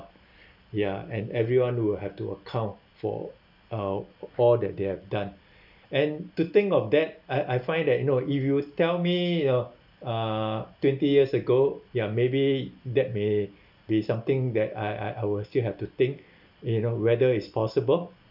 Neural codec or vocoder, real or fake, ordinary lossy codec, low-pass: none; real; none; 5.4 kHz